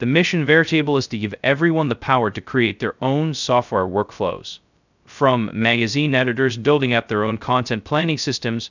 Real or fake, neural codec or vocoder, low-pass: fake; codec, 16 kHz, 0.2 kbps, FocalCodec; 7.2 kHz